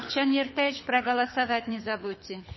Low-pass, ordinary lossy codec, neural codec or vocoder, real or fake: 7.2 kHz; MP3, 24 kbps; codec, 24 kHz, 6 kbps, HILCodec; fake